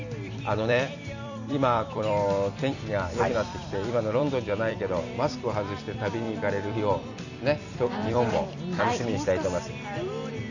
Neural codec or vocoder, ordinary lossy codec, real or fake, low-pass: vocoder, 44.1 kHz, 128 mel bands every 256 samples, BigVGAN v2; none; fake; 7.2 kHz